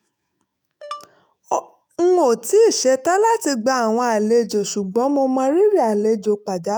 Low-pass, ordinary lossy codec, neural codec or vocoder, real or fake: none; none; autoencoder, 48 kHz, 128 numbers a frame, DAC-VAE, trained on Japanese speech; fake